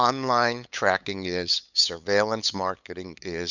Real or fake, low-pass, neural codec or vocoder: fake; 7.2 kHz; codec, 16 kHz, 8 kbps, FunCodec, trained on LibriTTS, 25 frames a second